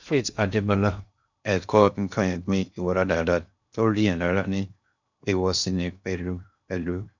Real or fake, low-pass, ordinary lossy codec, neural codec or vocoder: fake; 7.2 kHz; none; codec, 16 kHz in and 24 kHz out, 0.6 kbps, FocalCodec, streaming, 2048 codes